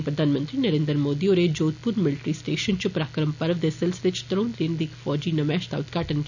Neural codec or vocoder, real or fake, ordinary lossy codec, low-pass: none; real; none; 7.2 kHz